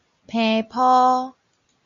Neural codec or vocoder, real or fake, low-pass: none; real; 7.2 kHz